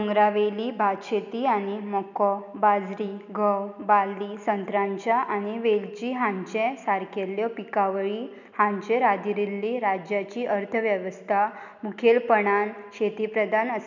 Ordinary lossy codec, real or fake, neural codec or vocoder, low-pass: none; real; none; 7.2 kHz